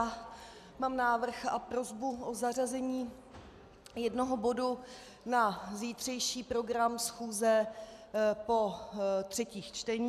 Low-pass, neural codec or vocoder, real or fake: 14.4 kHz; none; real